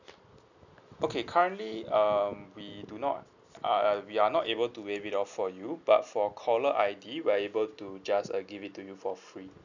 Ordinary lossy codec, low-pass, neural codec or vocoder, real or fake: none; 7.2 kHz; none; real